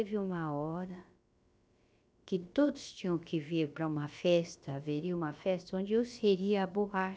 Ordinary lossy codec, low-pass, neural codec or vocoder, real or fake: none; none; codec, 16 kHz, about 1 kbps, DyCAST, with the encoder's durations; fake